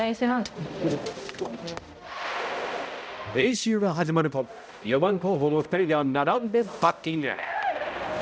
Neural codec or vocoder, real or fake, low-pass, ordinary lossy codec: codec, 16 kHz, 0.5 kbps, X-Codec, HuBERT features, trained on balanced general audio; fake; none; none